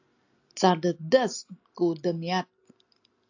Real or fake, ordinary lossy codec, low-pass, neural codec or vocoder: real; AAC, 48 kbps; 7.2 kHz; none